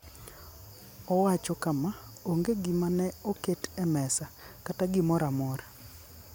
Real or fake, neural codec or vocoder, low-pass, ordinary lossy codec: real; none; none; none